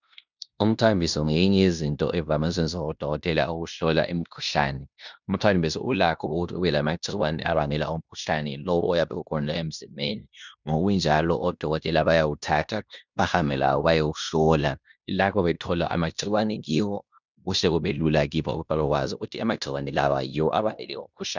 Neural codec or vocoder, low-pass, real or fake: codec, 16 kHz in and 24 kHz out, 0.9 kbps, LongCat-Audio-Codec, fine tuned four codebook decoder; 7.2 kHz; fake